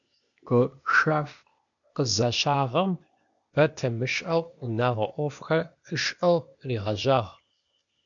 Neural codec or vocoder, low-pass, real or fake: codec, 16 kHz, 0.8 kbps, ZipCodec; 7.2 kHz; fake